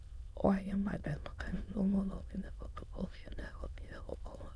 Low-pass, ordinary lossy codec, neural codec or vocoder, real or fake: none; none; autoencoder, 22.05 kHz, a latent of 192 numbers a frame, VITS, trained on many speakers; fake